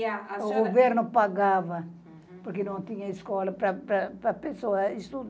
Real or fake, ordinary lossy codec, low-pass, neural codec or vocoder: real; none; none; none